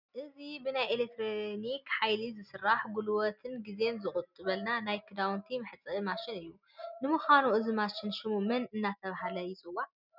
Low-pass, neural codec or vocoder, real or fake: 5.4 kHz; none; real